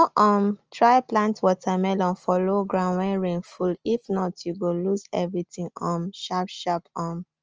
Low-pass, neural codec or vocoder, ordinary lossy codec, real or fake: 7.2 kHz; none; Opus, 24 kbps; real